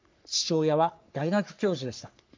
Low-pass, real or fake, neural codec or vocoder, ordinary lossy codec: 7.2 kHz; fake; codec, 44.1 kHz, 3.4 kbps, Pupu-Codec; MP3, 64 kbps